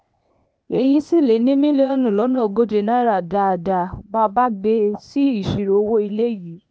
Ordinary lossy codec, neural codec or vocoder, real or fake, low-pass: none; codec, 16 kHz, 0.8 kbps, ZipCodec; fake; none